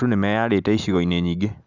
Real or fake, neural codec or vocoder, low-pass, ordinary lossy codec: real; none; 7.2 kHz; none